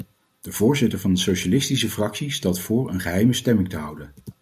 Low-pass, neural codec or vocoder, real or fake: 14.4 kHz; none; real